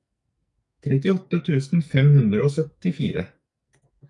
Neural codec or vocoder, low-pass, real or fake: codec, 44.1 kHz, 2.6 kbps, SNAC; 10.8 kHz; fake